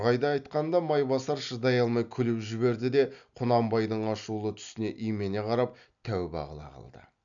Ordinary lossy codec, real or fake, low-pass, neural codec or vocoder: none; real; 7.2 kHz; none